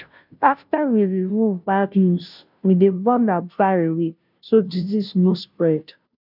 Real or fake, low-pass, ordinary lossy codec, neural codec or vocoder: fake; 5.4 kHz; none; codec, 16 kHz, 0.5 kbps, FunCodec, trained on Chinese and English, 25 frames a second